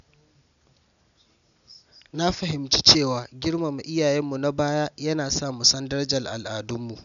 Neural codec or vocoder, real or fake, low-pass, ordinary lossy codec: none; real; 7.2 kHz; none